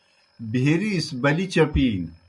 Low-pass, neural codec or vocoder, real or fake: 10.8 kHz; none; real